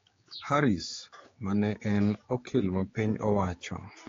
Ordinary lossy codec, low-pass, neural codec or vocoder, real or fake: AAC, 32 kbps; 7.2 kHz; codec, 16 kHz, 4 kbps, X-Codec, HuBERT features, trained on general audio; fake